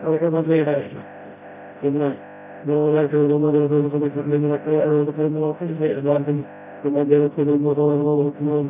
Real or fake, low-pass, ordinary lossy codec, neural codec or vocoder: fake; 3.6 kHz; none; codec, 16 kHz, 0.5 kbps, FreqCodec, smaller model